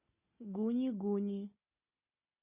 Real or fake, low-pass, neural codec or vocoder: real; 3.6 kHz; none